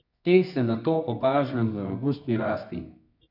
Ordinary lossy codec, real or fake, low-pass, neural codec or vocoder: none; fake; 5.4 kHz; codec, 24 kHz, 0.9 kbps, WavTokenizer, medium music audio release